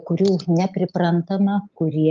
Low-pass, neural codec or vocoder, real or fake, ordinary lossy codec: 7.2 kHz; none; real; Opus, 32 kbps